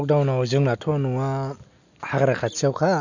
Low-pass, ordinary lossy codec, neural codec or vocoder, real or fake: 7.2 kHz; none; none; real